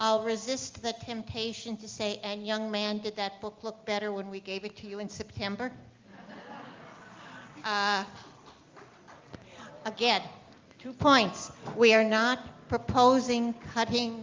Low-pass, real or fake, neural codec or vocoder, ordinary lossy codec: 7.2 kHz; real; none; Opus, 32 kbps